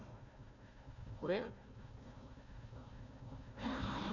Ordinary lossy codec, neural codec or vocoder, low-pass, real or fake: none; codec, 16 kHz, 1 kbps, FunCodec, trained on Chinese and English, 50 frames a second; 7.2 kHz; fake